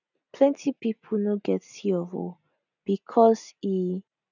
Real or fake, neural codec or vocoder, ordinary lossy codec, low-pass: real; none; none; 7.2 kHz